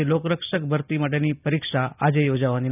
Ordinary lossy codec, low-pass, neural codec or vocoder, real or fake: none; 3.6 kHz; none; real